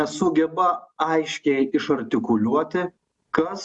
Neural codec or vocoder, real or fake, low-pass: none; real; 10.8 kHz